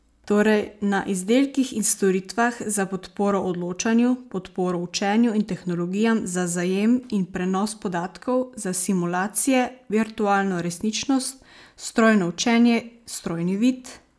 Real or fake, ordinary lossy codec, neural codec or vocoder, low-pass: real; none; none; none